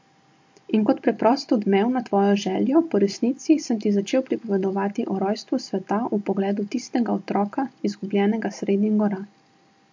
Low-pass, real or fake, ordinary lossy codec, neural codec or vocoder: 7.2 kHz; real; MP3, 48 kbps; none